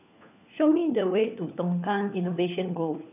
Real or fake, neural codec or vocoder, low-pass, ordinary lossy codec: fake; codec, 16 kHz, 4 kbps, FunCodec, trained on LibriTTS, 50 frames a second; 3.6 kHz; none